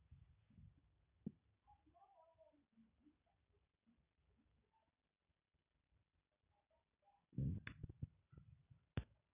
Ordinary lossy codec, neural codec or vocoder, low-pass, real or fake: none; none; 3.6 kHz; real